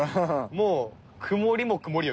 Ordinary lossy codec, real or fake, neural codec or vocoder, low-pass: none; real; none; none